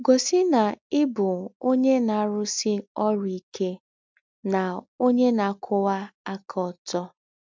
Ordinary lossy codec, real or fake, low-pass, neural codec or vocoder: MP3, 64 kbps; real; 7.2 kHz; none